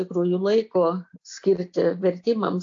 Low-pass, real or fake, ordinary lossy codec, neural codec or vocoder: 7.2 kHz; real; AAC, 48 kbps; none